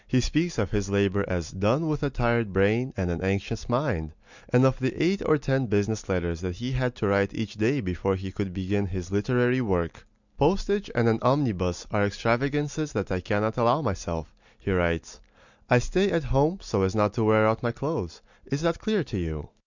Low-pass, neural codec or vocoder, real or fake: 7.2 kHz; none; real